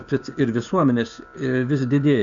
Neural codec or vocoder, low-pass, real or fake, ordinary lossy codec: codec, 16 kHz, 6 kbps, DAC; 7.2 kHz; fake; Opus, 64 kbps